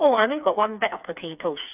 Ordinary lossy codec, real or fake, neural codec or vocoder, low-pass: none; fake; codec, 16 kHz, 4 kbps, FreqCodec, smaller model; 3.6 kHz